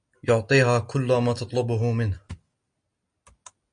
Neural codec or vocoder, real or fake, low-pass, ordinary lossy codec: none; real; 9.9 kHz; MP3, 48 kbps